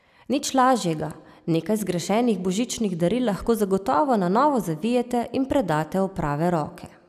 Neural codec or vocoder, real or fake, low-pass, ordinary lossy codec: none; real; 14.4 kHz; none